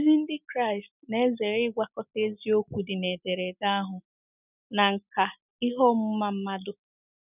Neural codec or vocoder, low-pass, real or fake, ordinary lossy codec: none; 3.6 kHz; real; none